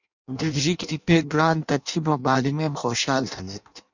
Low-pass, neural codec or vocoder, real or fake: 7.2 kHz; codec, 16 kHz in and 24 kHz out, 0.6 kbps, FireRedTTS-2 codec; fake